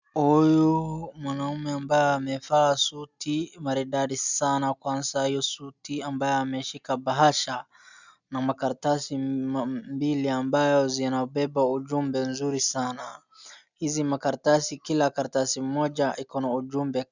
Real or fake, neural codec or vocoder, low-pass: real; none; 7.2 kHz